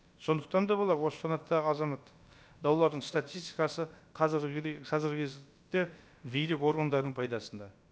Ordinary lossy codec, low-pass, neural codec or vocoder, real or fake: none; none; codec, 16 kHz, about 1 kbps, DyCAST, with the encoder's durations; fake